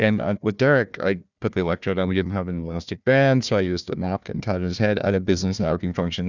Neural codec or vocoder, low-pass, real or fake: codec, 16 kHz, 1 kbps, FunCodec, trained on Chinese and English, 50 frames a second; 7.2 kHz; fake